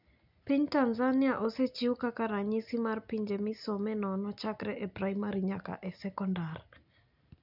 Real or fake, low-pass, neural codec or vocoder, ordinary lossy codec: real; 5.4 kHz; none; none